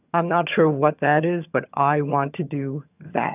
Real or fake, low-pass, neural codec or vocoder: fake; 3.6 kHz; vocoder, 22.05 kHz, 80 mel bands, HiFi-GAN